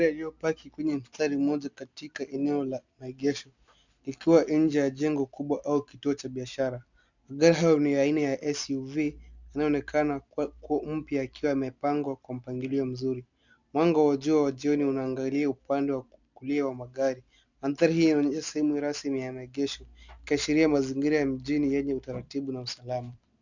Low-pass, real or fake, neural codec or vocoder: 7.2 kHz; real; none